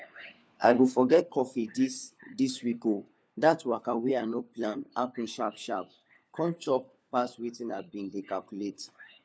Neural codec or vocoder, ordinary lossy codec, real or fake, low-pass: codec, 16 kHz, 4 kbps, FunCodec, trained on LibriTTS, 50 frames a second; none; fake; none